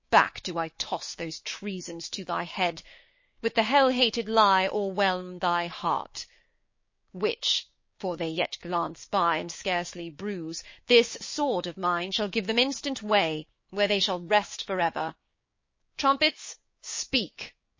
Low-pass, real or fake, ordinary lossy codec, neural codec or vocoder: 7.2 kHz; fake; MP3, 32 kbps; codec, 16 kHz, 6 kbps, DAC